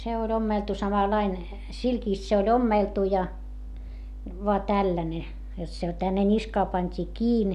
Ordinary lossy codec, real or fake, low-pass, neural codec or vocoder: none; real; 14.4 kHz; none